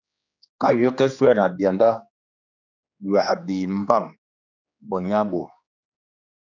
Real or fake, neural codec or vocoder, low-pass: fake; codec, 16 kHz, 2 kbps, X-Codec, HuBERT features, trained on general audio; 7.2 kHz